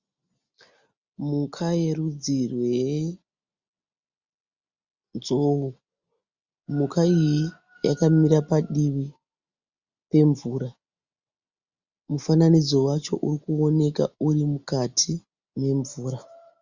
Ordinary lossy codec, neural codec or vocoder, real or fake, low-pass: Opus, 64 kbps; none; real; 7.2 kHz